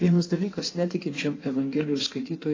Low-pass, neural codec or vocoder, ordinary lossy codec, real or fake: 7.2 kHz; codec, 16 kHz in and 24 kHz out, 1.1 kbps, FireRedTTS-2 codec; AAC, 32 kbps; fake